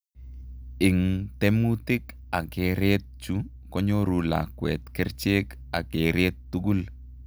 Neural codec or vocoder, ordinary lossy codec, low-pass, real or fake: none; none; none; real